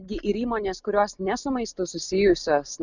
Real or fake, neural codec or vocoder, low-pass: real; none; 7.2 kHz